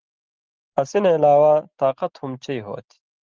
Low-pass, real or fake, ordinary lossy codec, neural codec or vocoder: 7.2 kHz; real; Opus, 16 kbps; none